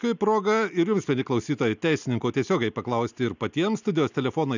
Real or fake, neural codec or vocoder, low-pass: real; none; 7.2 kHz